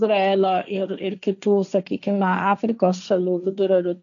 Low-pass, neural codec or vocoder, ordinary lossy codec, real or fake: 7.2 kHz; codec, 16 kHz, 1.1 kbps, Voila-Tokenizer; MP3, 96 kbps; fake